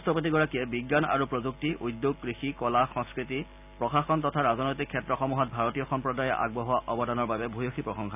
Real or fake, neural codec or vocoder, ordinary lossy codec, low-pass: real; none; none; 3.6 kHz